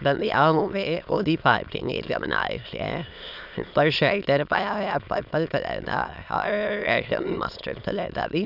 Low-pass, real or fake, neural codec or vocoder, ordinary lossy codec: 5.4 kHz; fake; autoencoder, 22.05 kHz, a latent of 192 numbers a frame, VITS, trained on many speakers; none